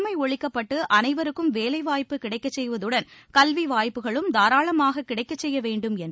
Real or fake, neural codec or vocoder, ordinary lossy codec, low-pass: real; none; none; none